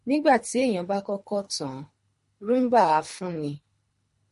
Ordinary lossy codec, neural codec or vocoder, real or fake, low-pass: MP3, 48 kbps; codec, 24 kHz, 3 kbps, HILCodec; fake; 10.8 kHz